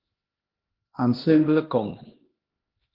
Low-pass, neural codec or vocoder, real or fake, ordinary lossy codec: 5.4 kHz; codec, 16 kHz, 1 kbps, X-Codec, HuBERT features, trained on LibriSpeech; fake; Opus, 16 kbps